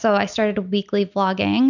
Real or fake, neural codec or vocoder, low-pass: real; none; 7.2 kHz